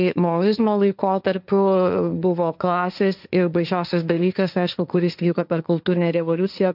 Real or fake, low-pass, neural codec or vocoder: fake; 5.4 kHz; codec, 16 kHz, 1.1 kbps, Voila-Tokenizer